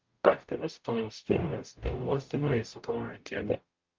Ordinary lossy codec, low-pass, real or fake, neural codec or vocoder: Opus, 32 kbps; 7.2 kHz; fake; codec, 44.1 kHz, 0.9 kbps, DAC